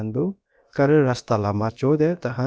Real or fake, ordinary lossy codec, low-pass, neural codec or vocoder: fake; none; none; codec, 16 kHz, about 1 kbps, DyCAST, with the encoder's durations